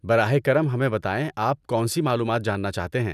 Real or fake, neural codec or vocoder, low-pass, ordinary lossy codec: real; none; 14.4 kHz; none